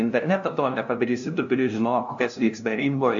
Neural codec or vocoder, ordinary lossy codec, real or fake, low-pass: codec, 16 kHz, 0.5 kbps, FunCodec, trained on LibriTTS, 25 frames a second; AAC, 64 kbps; fake; 7.2 kHz